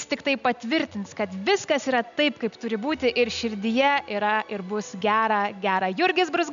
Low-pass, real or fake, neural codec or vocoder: 7.2 kHz; real; none